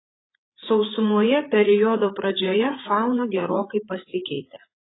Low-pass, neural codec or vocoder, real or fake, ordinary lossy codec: 7.2 kHz; codec, 16 kHz, 8 kbps, FreqCodec, larger model; fake; AAC, 16 kbps